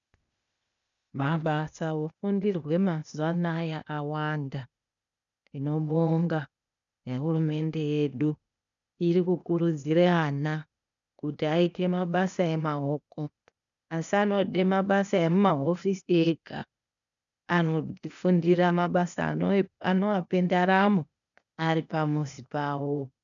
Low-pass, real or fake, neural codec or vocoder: 7.2 kHz; fake; codec, 16 kHz, 0.8 kbps, ZipCodec